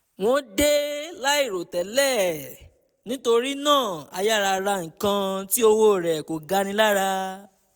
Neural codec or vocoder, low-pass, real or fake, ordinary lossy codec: none; none; real; none